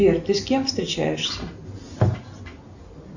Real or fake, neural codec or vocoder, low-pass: real; none; 7.2 kHz